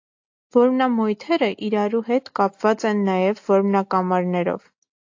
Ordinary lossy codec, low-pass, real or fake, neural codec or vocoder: AAC, 48 kbps; 7.2 kHz; real; none